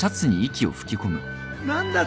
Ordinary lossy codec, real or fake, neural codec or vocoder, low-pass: none; real; none; none